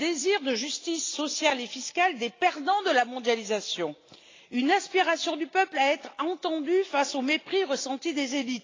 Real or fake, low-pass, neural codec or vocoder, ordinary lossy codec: real; 7.2 kHz; none; AAC, 32 kbps